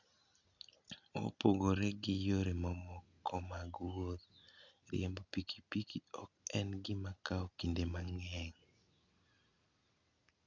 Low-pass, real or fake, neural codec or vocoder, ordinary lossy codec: 7.2 kHz; real; none; none